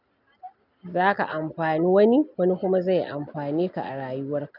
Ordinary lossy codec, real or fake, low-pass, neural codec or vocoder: none; real; 5.4 kHz; none